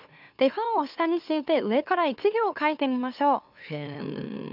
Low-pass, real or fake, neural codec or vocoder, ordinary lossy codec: 5.4 kHz; fake; autoencoder, 44.1 kHz, a latent of 192 numbers a frame, MeloTTS; none